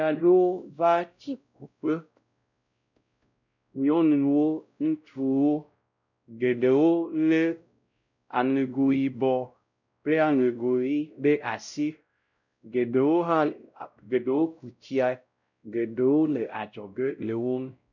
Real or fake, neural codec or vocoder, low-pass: fake; codec, 16 kHz, 0.5 kbps, X-Codec, WavLM features, trained on Multilingual LibriSpeech; 7.2 kHz